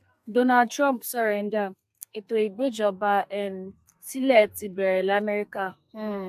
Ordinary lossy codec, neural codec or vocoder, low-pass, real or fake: AAC, 96 kbps; codec, 32 kHz, 1.9 kbps, SNAC; 14.4 kHz; fake